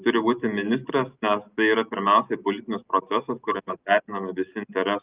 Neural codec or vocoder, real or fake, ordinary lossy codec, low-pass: none; real; Opus, 24 kbps; 3.6 kHz